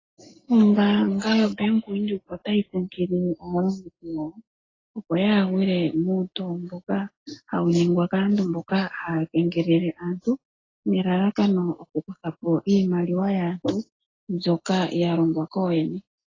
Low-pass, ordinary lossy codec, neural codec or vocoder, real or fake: 7.2 kHz; AAC, 32 kbps; none; real